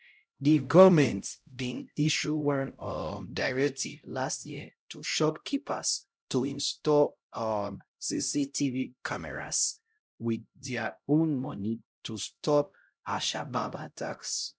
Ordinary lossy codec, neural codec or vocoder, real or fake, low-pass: none; codec, 16 kHz, 0.5 kbps, X-Codec, HuBERT features, trained on LibriSpeech; fake; none